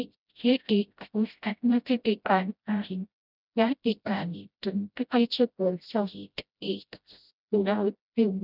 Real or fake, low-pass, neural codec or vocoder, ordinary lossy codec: fake; 5.4 kHz; codec, 16 kHz, 0.5 kbps, FreqCodec, smaller model; none